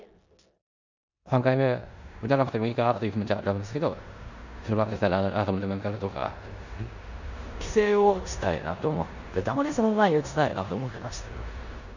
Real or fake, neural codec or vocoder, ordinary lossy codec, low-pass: fake; codec, 16 kHz in and 24 kHz out, 0.9 kbps, LongCat-Audio-Codec, four codebook decoder; none; 7.2 kHz